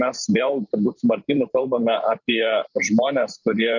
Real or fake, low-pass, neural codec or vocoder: fake; 7.2 kHz; vocoder, 44.1 kHz, 128 mel bands every 512 samples, BigVGAN v2